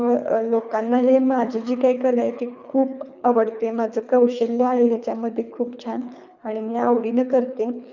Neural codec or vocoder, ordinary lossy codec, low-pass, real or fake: codec, 24 kHz, 3 kbps, HILCodec; none; 7.2 kHz; fake